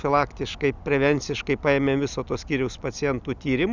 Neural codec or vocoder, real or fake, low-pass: none; real; 7.2 kHz